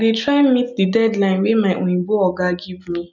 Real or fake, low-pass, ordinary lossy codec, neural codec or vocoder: real; 7.2 kHz; none; none